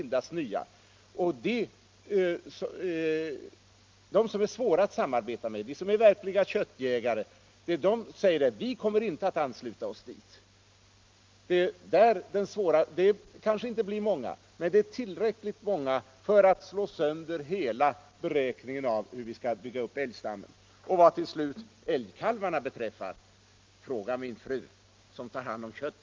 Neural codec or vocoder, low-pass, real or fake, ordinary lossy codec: none; 7.2 kHz; real; Opus, 24 kbps